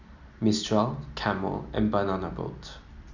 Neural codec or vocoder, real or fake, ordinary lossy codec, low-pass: none; real; none; 7.2 kHz